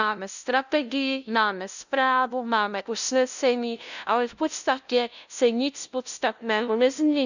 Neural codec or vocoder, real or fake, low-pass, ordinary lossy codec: codec, 16 kHz, 0.5 kbps, FunCodec, trained on LibriTTS, 25 frames a second; fake; 7.2 kHz; none